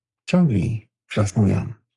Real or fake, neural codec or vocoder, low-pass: fake; codec, 44.1 kHz, 3.4 kbps, Pupu-Codec; 10.8 kHz